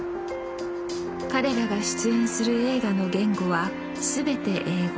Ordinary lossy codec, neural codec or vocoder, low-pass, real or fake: none; none; none; real